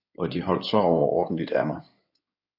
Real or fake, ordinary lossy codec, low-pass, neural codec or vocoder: fake; AAC, 48 kbps; 5.4 kHz; codec, 16 kHz in and 24 kHz out, 2.2 kbps, FireRedTTS-2 codec